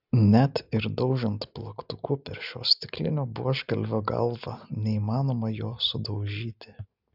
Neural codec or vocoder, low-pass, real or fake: none; 5.4 kHz; real